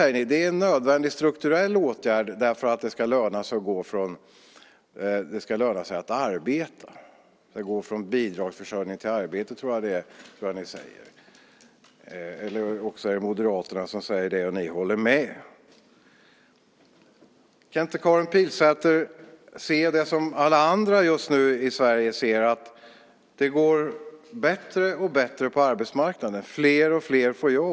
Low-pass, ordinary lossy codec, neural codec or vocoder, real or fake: none; none; none; real